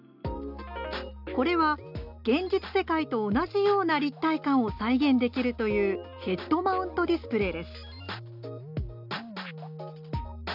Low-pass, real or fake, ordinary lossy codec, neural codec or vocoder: 5.4 kHz; real; none; none